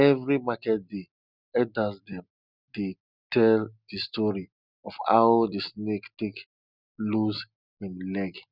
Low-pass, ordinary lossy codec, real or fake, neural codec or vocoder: 5.4 kHz; none; real; none